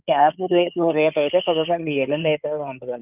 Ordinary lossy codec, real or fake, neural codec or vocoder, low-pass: none; fake; codec, 16 kHz in and 24 kHz out, 2.2 kbps, FireRedTTS-2 codec; 3.6 kHz